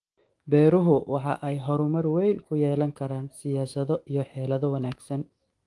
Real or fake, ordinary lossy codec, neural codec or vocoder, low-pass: fake; Opus, 24 kbps; autoencoder, 48 kHz, 128 numbers a frame, DAC-VAE, trained on Japanese speech; 10.8 kHz